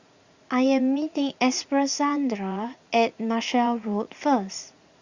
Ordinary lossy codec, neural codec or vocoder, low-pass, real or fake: Opus, 64 kbps; vocoder, 44.1 kHz, 80 mel bands, Vocos; 7.2 kHz; fake